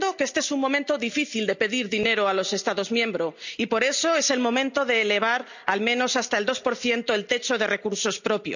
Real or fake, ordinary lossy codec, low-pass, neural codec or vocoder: real; none; 7.2 kHz; none